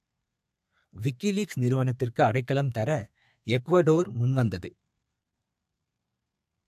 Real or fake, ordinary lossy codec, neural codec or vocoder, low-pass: fake; AAC, 96 kbps; codec, 32 kHz, 1.9 kbps, SNAC; 14.4 kHz